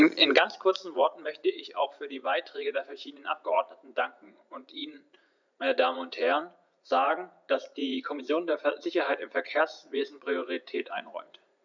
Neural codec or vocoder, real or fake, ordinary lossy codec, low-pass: vocoder, 44.1 kHz, 80 mel bands, Vocos; fake; none; 7.2 kHz